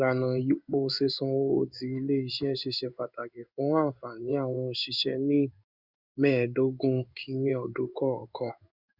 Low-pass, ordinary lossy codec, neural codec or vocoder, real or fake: 5.4 kHz; Opus, 64 kbps; codec, 16 kHz in and 24 kHz out, 1 kbps, XY-Tokenizer; fake